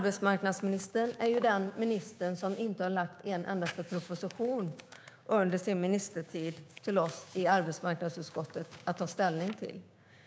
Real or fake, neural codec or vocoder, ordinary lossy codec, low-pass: fake; codec, 16 kHz, 6 kbps, DAC; none; none